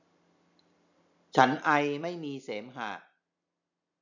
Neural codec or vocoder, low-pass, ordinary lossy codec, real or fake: none; 7.2 kHz; none; real